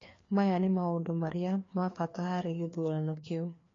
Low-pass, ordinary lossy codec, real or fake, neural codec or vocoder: 7.2 kHz; AAC, 32 kbps; fake; codec, 16 kHz, 2 kbps, FreqCodec, larger model